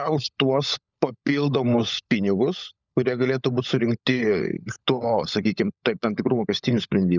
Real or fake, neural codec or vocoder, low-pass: fake; codec, 16 kHz, 16 kbps, FunCodec, trained on LibriTTS, 50 frames a second; 7.2 kHz